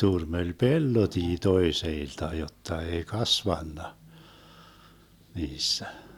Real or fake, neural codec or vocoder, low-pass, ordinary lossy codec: real; none; 19.8 kHz; none